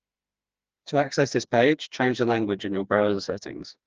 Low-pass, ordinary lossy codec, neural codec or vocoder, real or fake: 7.2 kHz; Opus, 24 kbps; codec, 16 kHz, 2 kbps, FreqCodec, smaller model; fake